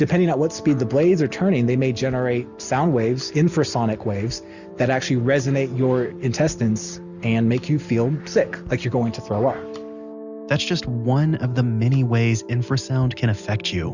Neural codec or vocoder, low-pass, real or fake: none; 7.2 kHz; real